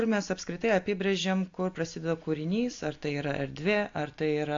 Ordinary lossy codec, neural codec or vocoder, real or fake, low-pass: AAC, 48 kbps; none; real; 7.2 kHz